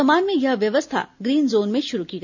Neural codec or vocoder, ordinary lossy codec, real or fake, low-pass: none; none; real; 7.2 kHz